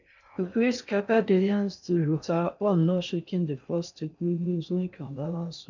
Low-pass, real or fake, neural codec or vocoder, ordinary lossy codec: 7.2 kHz; fake; codec, 16 kHz in and 24 kHz out, 0.6 kbps, FocalCodec, streaming, 4096 codes; none